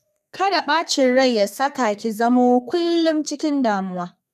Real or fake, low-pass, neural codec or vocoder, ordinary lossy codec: fake; 14.4 kHz; codec, 32 kHz, 1.9 kbps, SNAC; none